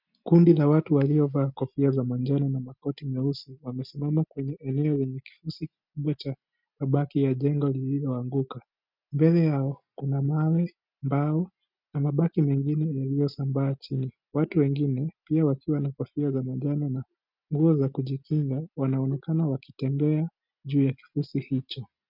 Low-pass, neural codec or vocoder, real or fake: 5.4 kHz; none; real